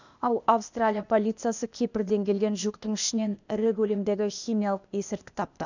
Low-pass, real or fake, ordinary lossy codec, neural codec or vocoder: 7.2 kHz; fake; none; codec, 16 kHz, 0.8 kbps, ZipCodec